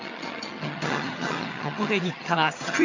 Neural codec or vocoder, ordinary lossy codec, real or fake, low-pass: vocoder, 22.05 kHz, 80 mel bands, HiFi-GAN; AAC, 48 kbps; fake; 7.2 kHz